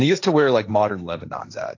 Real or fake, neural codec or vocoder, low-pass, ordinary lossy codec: fake; codec, 16 kHz, 1.1 kbps, Voila-Tokenizer; 7.2 kHz; AAC, 48 kbps